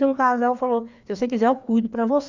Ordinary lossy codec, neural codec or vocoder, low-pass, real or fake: Opus, 64 kbps; codec, 16 kHz, 2 kbps, FreqCodec, larger model; 7.2 kHz; fake